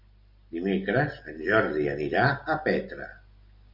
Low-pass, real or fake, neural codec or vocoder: 5.4 kHz; real; none